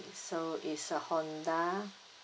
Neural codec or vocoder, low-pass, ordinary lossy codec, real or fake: none; none; none; real